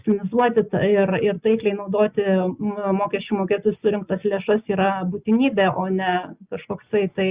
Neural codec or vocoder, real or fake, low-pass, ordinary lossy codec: none; real; 3.6 kHz; Opus, 64 kbps